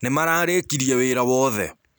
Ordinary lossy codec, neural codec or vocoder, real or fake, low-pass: none; none; real; none